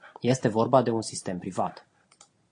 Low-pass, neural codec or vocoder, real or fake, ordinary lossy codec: 9.9 kHz; none; real; AAC, 48 kbps